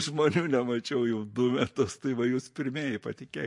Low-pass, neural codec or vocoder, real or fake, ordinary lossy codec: 10.8 kHz; vocoder, 44.1 kHz, 128 mel bands every 512 samples, BigVGAN v2; fake; MP3, 48 kbps